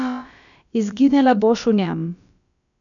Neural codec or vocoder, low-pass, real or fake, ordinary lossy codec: codec, 16 kHz, about 1 kbps, DyCAST, with the encoder's durations; 7.2 kHz; fake; none